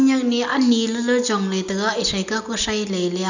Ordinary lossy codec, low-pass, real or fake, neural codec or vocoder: none; 7.2 kHz; real; none